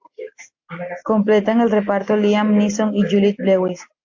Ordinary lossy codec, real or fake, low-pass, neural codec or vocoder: AAC, 48 kbps; real; 7.2 kHz; none